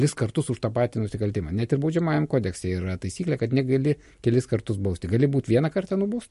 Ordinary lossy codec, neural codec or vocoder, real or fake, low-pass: MP3, 48 kbps; none; real; 14.4 kHz